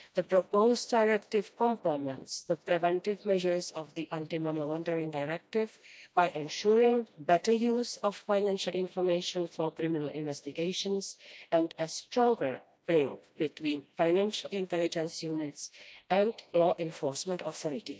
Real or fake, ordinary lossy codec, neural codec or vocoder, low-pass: fake; none; codec, 16 kHz, 1 kbps, FreqCodec, smaller model; none